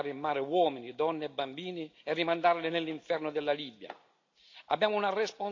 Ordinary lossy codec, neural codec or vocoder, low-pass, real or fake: AAC, 48 kbps; none; 7.2 kHz; real